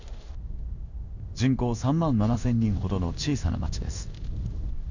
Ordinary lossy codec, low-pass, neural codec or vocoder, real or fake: none; 7.2 kHz; codec, 16 kHz in and 24 kHz out, 1 kbps, XY-Tokenizer; fake